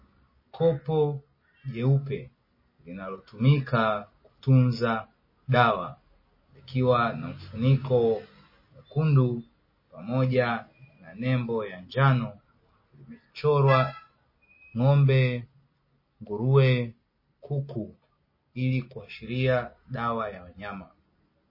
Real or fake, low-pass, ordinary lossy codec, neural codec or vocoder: real; 5.4 kHz; MP3, 24 kbps; none